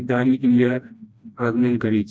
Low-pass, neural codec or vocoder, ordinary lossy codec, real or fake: none; codec, 16 kHz, 1 kbps, FreqCodec, smaller model; none; fake